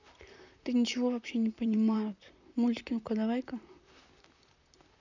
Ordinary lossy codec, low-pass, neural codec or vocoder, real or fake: none; 7.2 kHz; vocoder, 44.1 kHz, 128 mel bands, Pupu-Vocoder; fake